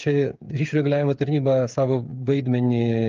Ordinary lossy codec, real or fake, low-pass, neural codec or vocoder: Opus, 24 kbps; fake; 7.2 kHz; codec, 16 kHz, 8 kbps, FreqCodec, smaller model